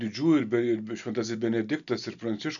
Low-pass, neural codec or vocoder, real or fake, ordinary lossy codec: 7.2 kHz; none; real; MP3, 96 kbps